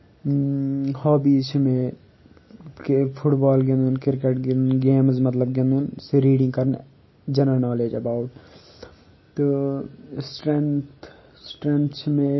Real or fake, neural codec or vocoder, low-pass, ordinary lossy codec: real; none; 7.2 kHz; MP3, 24 kbps